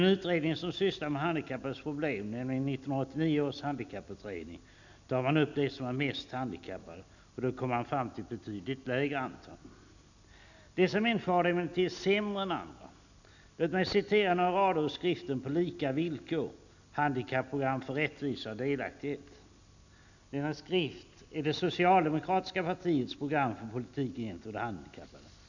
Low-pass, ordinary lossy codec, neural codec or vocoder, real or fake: 7.2 kHz; none; none; real